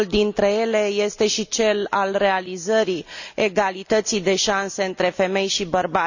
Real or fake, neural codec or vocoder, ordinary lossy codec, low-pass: real; none; none; 7.2 kHz